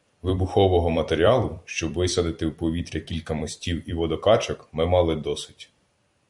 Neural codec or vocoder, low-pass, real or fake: vocoder, 44.1 kHz, 128 mel bands every 256 samples, BigVGAN v2; 10.8 kHz; fake